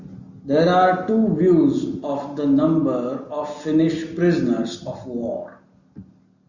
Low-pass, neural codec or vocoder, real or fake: 7.2 kHz; none; real